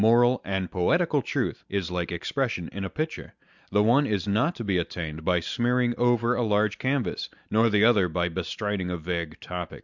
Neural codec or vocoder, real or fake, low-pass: none; real; 7.2 kHz